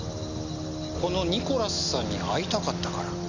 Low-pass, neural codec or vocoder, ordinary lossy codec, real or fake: 7.2 kHz; none; none; real